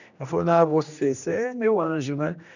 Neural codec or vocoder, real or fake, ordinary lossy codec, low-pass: codec, 16 kHz, 1 kbps, X-Codec, HuBERT features, trained on general audio; fake; none; 7.2 kHz